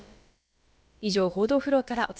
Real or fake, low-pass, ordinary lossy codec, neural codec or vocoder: fake; none; none; codec, 16 kHz, about 1 kbps, DyCAST, with the encoder's durations